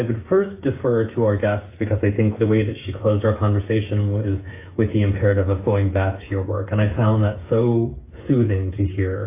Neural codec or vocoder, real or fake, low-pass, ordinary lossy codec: codec, 16 kHz, 8 kbps, FreqCodec, smaller model; fake; 3.6 kHz; AAC, 24 kbps